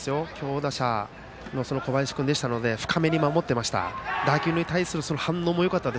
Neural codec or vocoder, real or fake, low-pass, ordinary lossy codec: none; real; none; none